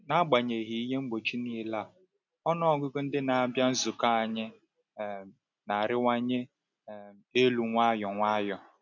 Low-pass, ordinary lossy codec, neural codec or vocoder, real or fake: 7.2 kHz; none; none; real